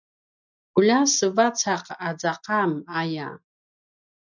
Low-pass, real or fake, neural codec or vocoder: 7.2 kHz; real; none